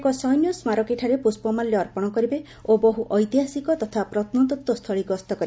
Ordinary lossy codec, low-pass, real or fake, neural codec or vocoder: none; none; real; none